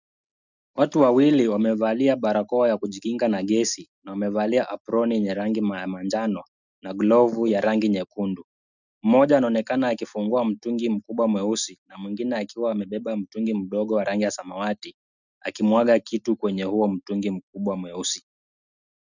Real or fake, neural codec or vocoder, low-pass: real; none; 7.2 kHz